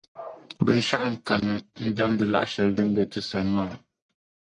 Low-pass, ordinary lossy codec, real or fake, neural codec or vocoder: 10.8 kHz; MP3, 96 kbps; fake; codec, 44.1 kHz, 1.7 kbps, Pupu-Codec